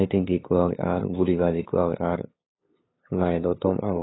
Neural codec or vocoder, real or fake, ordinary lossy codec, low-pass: codec, 16 kHz, 8 kbps, FunCodec, trained on LibriTTS, 25 frames a second; fake; AAC, 16 kbps; 7.2 kHz